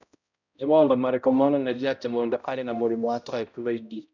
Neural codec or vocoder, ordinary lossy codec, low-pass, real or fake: codec, 16 kHz, 0.5 kbps, X-Codec, HuBERT features, trained on balanced general audio; none; 7.2 kHz; fake